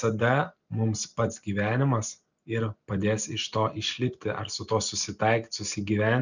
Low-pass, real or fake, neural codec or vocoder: 7.2 kHz; real; none